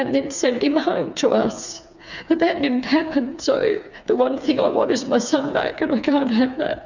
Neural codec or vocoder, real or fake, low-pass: codec, 16 kHz, 4 kbps, FreqCodec, smaller model; fake; 7.2 kHz